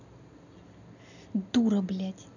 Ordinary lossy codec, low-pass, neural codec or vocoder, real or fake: none; 7.2 kHz; none; real